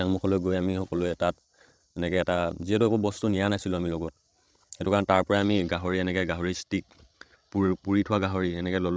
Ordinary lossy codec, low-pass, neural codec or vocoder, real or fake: none; none; codec, 16 kHz, 16 kbps, FreqCodec, larger model; fake